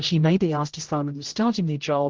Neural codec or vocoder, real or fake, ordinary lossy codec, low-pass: codec, 16 kHz, 0.5 kbps, X-Codec, HuBERT features, trained on general audio; fake; Opus, 16 kbps; 7.2 kHz